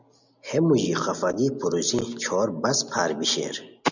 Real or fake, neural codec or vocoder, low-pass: real; none; 7.2 kHz